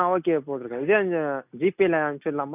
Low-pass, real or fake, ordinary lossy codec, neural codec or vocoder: 3.6 kHz; real; none; none